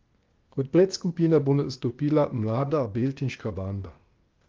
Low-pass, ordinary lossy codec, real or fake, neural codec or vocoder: 7.2 kHz; Opus, 24 kbps; fake; codec, 16 kHz, 0.8 kbps, ZipCodec